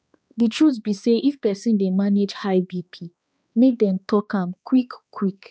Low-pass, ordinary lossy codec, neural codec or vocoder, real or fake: none; none; codec, 16 kHz, 2 kbps, X-Codec, HuBERT features, trained on balanced general audio; fake